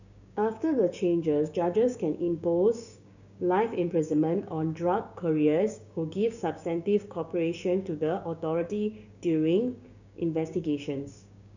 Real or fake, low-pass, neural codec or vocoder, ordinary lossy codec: fake; 7.2 kHz; codec, 16 kHz, 6 kbps, DAC; none